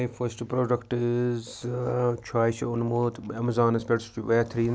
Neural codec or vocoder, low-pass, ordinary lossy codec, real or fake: none; none; none; real